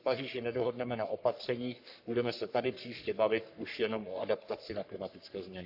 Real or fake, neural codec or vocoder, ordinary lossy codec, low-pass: fake; codec, 44.1 kHz, 3.4 kbps, Pupu-Codec; AAC, 48 kbps; 5.4 kHz